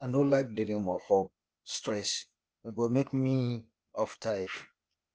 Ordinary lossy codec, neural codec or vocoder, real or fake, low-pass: none; codec, 16 kHz, 0.8 kbps, ZipCodec; fake; none